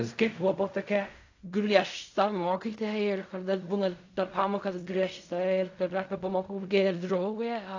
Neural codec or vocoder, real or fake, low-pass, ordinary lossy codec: codec, 16 kHz in and 24 kHz out, 0.4 kbps, LongCat-Audio-Codec, fine tuned four codebook decoder; fake; 7.2 kHz; AAC, 48 kbps